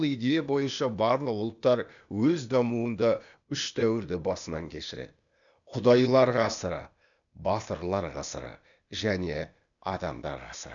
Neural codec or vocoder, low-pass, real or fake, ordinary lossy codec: codec, 16 kHz, 0.8 kbps, ZipCodec; 7.2 kHz; fake; none